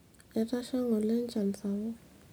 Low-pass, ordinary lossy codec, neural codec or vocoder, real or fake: none; none; none; real